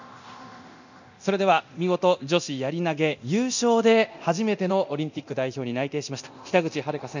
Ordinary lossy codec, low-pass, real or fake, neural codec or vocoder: none; 7.2 kHz; fake; codec, 24 kHz, 0.9 kbps, DualCodec